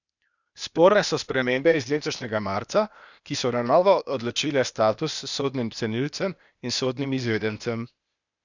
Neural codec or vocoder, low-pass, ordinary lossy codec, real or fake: codec, 16 kHz, 0.8 kbps, ZipCodec; 7.2 kHz; none; fake